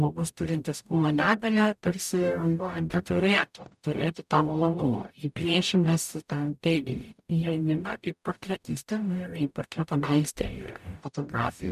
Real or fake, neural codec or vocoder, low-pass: fake; codec, 44.1 kHz, 0.9 kbps, DAC; 14.4 kHz